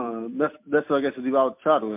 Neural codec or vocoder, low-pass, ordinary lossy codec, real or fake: none; 3.6 kHz; MP3, 32 kbps; real